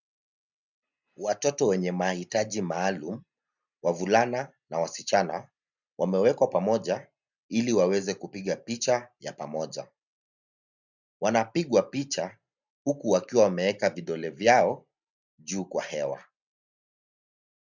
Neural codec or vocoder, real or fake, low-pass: none; real; 7.2 kHz